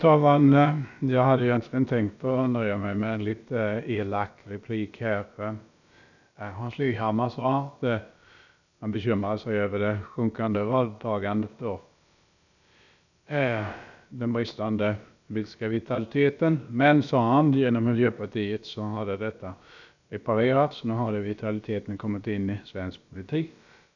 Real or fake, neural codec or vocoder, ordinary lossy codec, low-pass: fake; codec, 16 kHz, about 1 kbps, DyCAST, with the encoder's durations; none; 7.2 kHz